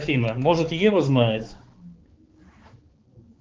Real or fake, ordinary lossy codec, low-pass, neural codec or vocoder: fake; Opus, 16 kbps; 7.2 kHz; codec, 16 kHz, 4 kbps, X-Codec, HuBERT features, trained on balanced general audio